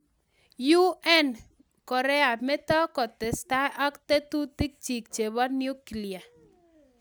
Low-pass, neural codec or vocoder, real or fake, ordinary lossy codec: none; none; real; none